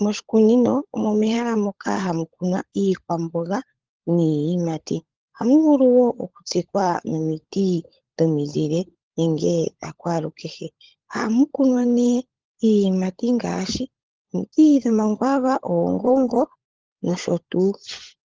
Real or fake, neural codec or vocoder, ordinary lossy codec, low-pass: fake; codec, 16 kHz, 16 kbps, FunCodec, trained on LibriTTS, 50 frames a second; Opus, 16 kbps; 7.2 kHz